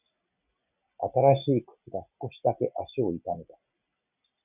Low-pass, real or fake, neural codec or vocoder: 3.6 kHz; real; none